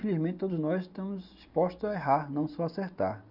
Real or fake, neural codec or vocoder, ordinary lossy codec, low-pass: real; none; none; 5.4 kHz